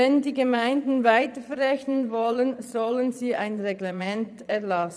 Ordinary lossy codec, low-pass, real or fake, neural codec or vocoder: none; none; fake; vocoder, 22.05 kHz, 80 mel bands, Vocos